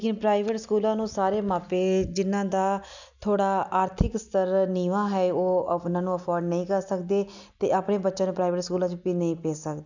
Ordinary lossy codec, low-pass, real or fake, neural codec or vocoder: none; 7.2 kHz; real; none